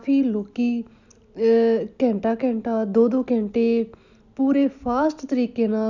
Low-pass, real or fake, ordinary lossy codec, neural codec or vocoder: 7.2 kHz; real; none; none